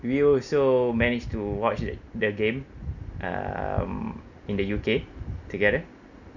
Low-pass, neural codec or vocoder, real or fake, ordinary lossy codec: 7.2 kHz; none; real; none